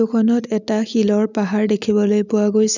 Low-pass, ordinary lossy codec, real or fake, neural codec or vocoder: 7.2 kHz; none; real; none